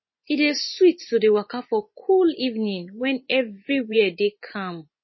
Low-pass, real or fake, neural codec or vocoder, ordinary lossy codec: 7.2 kHz; fake; vocoder, 24 kHz, 100 mel bands, Vocos; MP3, 24 kbps